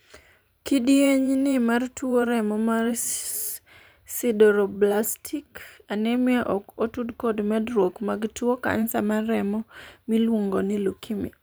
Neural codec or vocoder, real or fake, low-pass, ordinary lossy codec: vocoder, 44.1 kHz, 128 mel bands every 512 samples, BigVGAN v2; fake; none; none